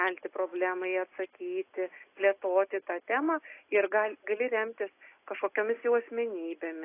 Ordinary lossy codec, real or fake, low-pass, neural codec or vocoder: AAC, 24 kbps; real; 3.6 kHz; none